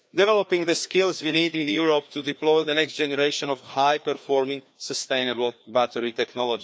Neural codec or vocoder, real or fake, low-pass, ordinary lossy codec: codec, 16 kHz, 2 kbps, FreqCodec, larger model; fake; none; none